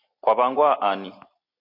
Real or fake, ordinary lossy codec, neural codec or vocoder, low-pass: real; MP3, 32 kbps; none; 5.4 kHz